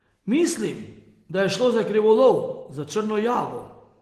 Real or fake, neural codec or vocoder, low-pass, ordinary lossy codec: real; none; 14.4 kHz; Opus, 16 kbps